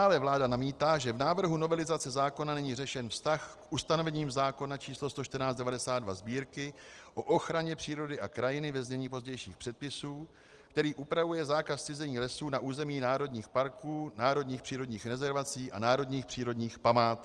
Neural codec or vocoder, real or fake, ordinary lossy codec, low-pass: none; real; Opus, 24 kbps; 10.8 kHz